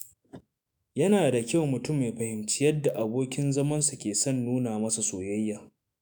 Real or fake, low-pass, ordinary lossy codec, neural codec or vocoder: fake; none; none; autoencoder, 48 kHz, 128 numbers a frame, DAC-VAE, trained on Japanese speech